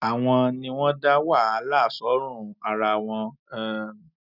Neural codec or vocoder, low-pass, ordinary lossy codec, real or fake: none; 5.4 kHz; none; real